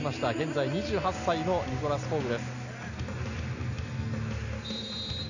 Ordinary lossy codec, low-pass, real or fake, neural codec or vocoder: none; 7.2 kHz; real; none